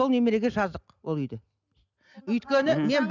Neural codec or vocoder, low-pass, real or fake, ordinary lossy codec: none; 7.2 kHz; real; none